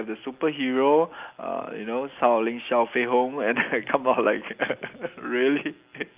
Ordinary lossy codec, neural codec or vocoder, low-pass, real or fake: Opus, 24 kbps; none; 3.6 kHz; real